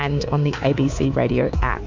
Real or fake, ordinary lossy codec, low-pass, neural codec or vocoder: fake; MP3, 64 kbps; 7.2 kHz; codec, 24 kHz, 3.1 kbps, DualCodec